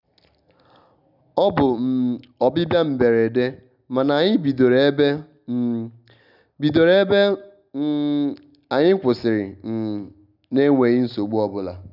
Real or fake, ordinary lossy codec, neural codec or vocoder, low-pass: real; none; none; 5.4 kHz